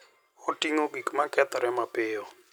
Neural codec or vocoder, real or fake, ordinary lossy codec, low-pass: none; real; none; none